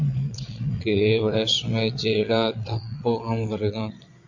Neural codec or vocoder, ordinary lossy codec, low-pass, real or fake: vocoder, 22.05 kHz, 80 mel bands, Vocos; AAC, 48 kbps; 7.2 kHz; fake